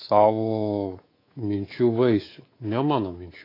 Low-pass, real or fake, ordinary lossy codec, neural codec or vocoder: 5.4 kHz; fake; AAC, 24 kbps; codec, 24 kHz, 3.1 kbps, DualCodec